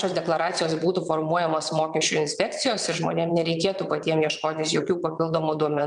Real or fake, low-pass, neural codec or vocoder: fake; 9.9 kHz; vocoder, 22.05 kHz, 80 mel bands, WaveNeXt